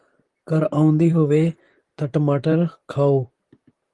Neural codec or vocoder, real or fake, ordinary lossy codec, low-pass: vocoder, 44.1 kHz, 128 mel bands, Pupu-Vocoder; fake; Opus, 24 kbps; 10.8 kHz